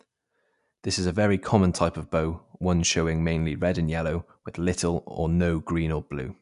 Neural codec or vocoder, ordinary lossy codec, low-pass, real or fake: none; none; 10.8 kHz; real